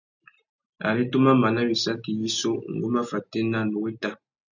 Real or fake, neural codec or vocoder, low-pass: real; none; 7.2 kHz